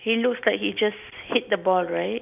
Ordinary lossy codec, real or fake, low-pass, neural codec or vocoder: none; real; 3.6 kHz; none